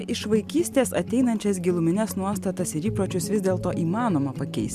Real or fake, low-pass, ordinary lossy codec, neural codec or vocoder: real; 14.4 kHz; MP3, 96 kbps; none